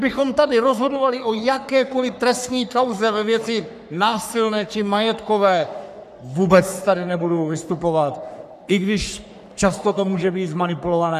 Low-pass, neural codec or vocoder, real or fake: 14.4 kHz; codec, 44.1 kHz, 3.4 kbps, Pupu-Codec; fake